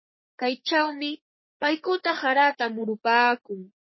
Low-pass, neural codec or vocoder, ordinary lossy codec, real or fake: 7.2 kHz; codec, 44.1 kHz, 3.4 kbps, Pupu-Codec; MP3, 24 kbps; fake